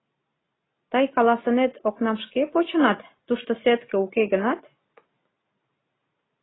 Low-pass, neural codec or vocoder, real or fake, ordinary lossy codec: 7.2 kHz; none; real; AAC, 16 kbps